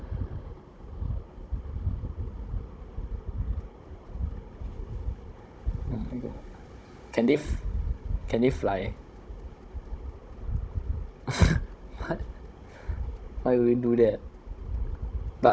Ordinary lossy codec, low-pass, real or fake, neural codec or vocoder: none; none; fake; codec, 16 kHz, 16 kbps, FunCodec, trained on Chinese and English, 50 frames a second